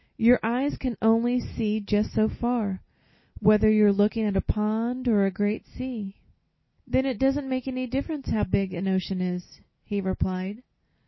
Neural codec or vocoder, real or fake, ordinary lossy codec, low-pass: none; real; MP3, 24 kbps; 7.2 kHz